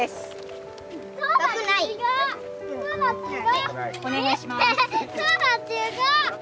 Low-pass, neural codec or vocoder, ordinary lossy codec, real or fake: none; none; none; real